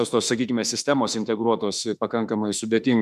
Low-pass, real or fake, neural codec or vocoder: 14.4 kHz; fake; autoencoder, 48 kHz, 32 numbers a frame, DAC-VAE, trained on Japanese speech